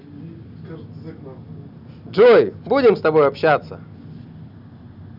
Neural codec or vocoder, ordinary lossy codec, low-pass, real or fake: none; none; 5.4 kHz; real